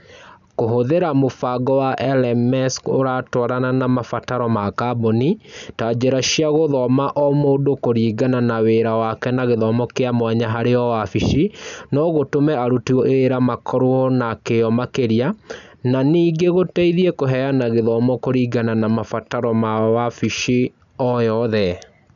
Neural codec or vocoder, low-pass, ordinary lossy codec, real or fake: none; 7.2 kHz; none; real